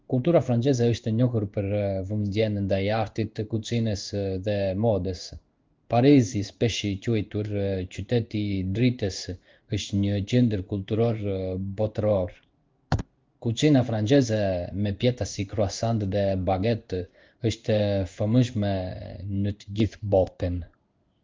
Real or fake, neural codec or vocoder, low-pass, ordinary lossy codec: fake; codec, 16 kHz in and 24 kHz out, 1 kbps, XY-Tokenizer; 7.2 kHz; Opus, 32 kbps